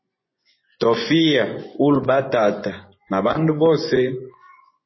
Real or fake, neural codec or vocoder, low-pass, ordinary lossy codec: fake; vocoder, 24 kHz, 100 mel bands, Vocos; 7.2 kHz; MP3, 24 kbps